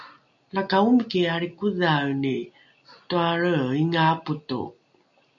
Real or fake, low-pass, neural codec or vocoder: real; 7.2 kHz; none